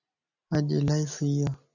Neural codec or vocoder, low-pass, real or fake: none; 7.2 kHz; real